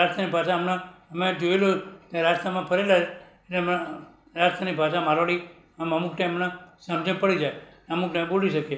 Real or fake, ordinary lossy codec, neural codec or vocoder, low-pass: real; none; none; none